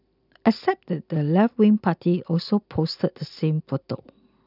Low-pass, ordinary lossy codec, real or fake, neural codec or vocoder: 5.4 kHz; none; real; none